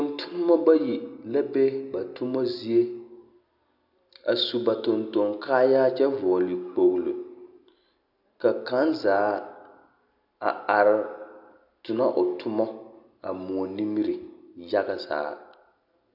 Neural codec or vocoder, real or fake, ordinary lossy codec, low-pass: none; real; AAC, 48 kbps; 5.4 kHz